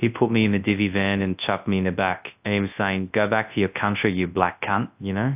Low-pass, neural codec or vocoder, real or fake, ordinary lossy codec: 3.6 kHz; codec, 24 kHz, 0.9 kbps, WavTokenizer, large speech release; fake; AAC, 32 kbps